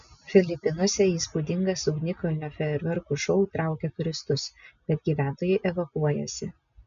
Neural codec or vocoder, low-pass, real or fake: none; 7.2 kHz; real